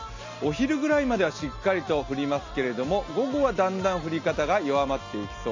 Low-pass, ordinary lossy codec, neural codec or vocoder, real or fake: 7.2 kHz; MP3, 48 kbps; none; real